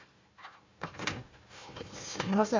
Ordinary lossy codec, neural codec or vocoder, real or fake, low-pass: MP3, 64 kbps; codec, 16 kHz, 1 kbps, FunCodec, trained on Chinese and English, 50 frames a second; fake; 7.2 kHz